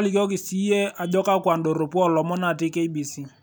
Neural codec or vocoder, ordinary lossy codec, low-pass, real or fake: none; none; none; real